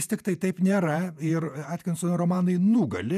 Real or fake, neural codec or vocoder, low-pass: fake; vocoder, 48 kHz, 128 mel bands, Vocos; 14.4 kHz